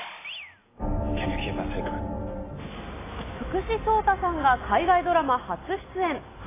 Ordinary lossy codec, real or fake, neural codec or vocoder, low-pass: AAC, 16 kbps; real; none; 3.6 kHz